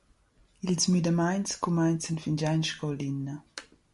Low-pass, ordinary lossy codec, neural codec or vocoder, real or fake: 14.4 kHz; MP3, 48 kbps; none; real